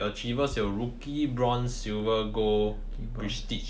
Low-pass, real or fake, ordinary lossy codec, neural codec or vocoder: none; real; none; none